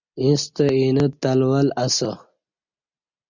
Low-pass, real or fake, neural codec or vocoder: 7.2 kHz; real; none